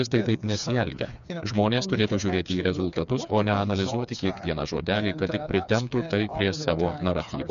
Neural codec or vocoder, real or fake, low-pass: codec, 16 kHz, 4 kbps, FreqCodec, smaller model; fake; 7.2 kHz